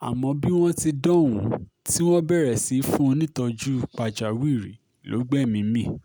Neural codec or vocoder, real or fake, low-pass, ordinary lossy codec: none; real; none; none